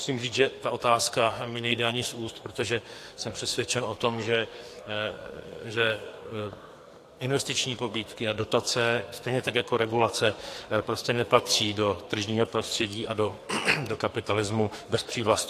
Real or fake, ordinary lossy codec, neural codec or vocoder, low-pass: fake; AAC, 64 kbps; codec, 44.1 kHz, 2.6 kbps, SNAC; 14.4 kHz